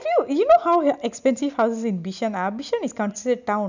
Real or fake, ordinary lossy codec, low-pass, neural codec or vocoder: real; none; 7.2 kHz; none